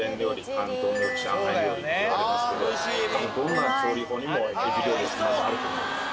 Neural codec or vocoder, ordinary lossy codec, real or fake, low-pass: none; none; real; none